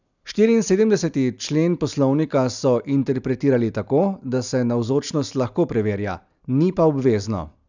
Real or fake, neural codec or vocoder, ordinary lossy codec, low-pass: real; none; none; 7.2 kHz